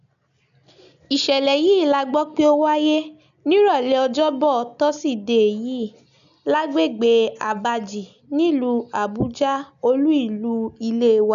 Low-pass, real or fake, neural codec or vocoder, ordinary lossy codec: 7.2 kHz; real; none; none